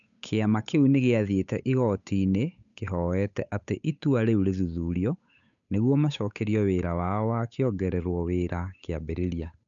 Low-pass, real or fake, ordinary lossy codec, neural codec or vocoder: 7.2 kHz; fake; none; codec, 16 kHz, 8 kbps, FunCodec, trained on Chinese and English, 25 frames a second